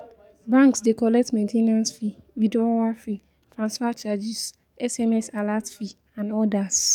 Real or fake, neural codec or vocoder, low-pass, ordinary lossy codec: fake; codec, 44.1 kHz, 7.8 kbps, DAC; 19.8 kHz; none